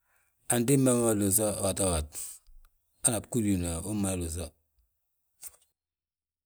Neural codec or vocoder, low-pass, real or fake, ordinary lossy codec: none; none; real; none